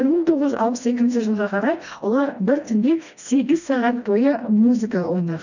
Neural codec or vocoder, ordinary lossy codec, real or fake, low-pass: codec, 16 kHz, 1 kbps, FreqCodec, smaller model; none; fake; 7.2 kHz